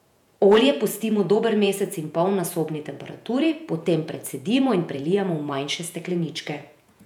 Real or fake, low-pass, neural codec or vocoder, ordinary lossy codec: fake; 19.8 kHz; vocoder, 48 kHz, 128 mel bands, Vocos; none